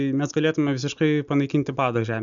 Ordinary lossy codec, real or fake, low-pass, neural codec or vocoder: MP3, 96 kbps; real; 7.2 kHz; none